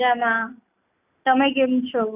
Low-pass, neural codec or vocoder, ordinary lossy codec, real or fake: 3.6 kHz; none; none; real